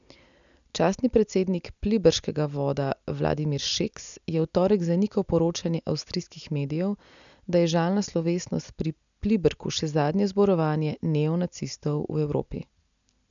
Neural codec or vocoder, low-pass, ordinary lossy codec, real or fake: none; 7.2 kHz; none; real